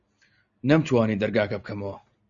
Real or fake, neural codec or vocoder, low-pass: real; none; 7.2 kHz